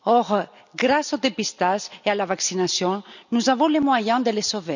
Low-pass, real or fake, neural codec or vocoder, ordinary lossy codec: 7.2 kHz; real; none; none